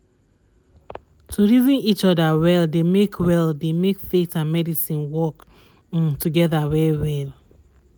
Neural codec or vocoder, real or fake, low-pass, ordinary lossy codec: none; real; none; none